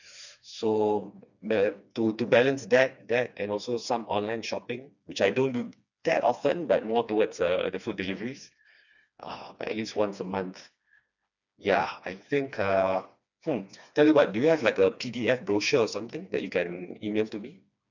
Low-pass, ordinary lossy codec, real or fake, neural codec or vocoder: 7.2 kHz; none; fake; codec, 16 kHz, 2 kbps, FreqCodec, smaller model